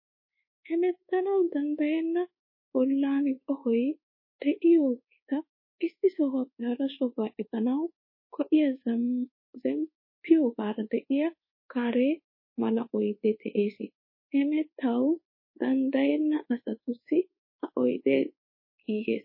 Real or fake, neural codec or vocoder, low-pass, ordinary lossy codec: fake; codec, 24 kHz, 1.2 kbps, DualCodec; 5.4 kHz; MP3, 24 kbps